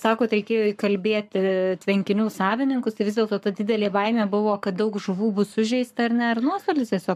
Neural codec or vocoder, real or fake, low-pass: codec, 44.1 kHz, 7.8 kbps, Pupu-Codec; fake; 14.4 kHz